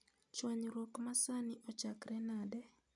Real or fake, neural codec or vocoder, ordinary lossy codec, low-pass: real; none; none; 10.8 kHz